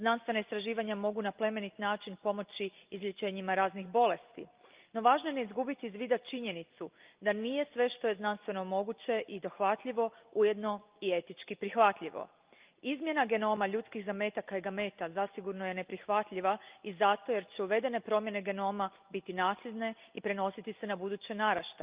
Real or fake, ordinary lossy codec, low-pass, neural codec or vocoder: real; Opus, 32 kbps; 3.6 kHz; none